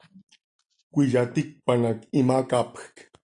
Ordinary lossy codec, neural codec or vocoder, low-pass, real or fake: AAC, 64 kbps; none; 9.9 kHz; real